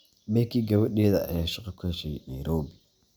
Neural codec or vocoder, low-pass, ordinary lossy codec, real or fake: none; none; none; real